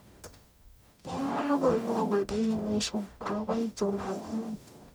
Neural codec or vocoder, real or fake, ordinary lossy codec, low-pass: codec, 44.1 kHz, 0.9 kbps, DAC; fake; none; none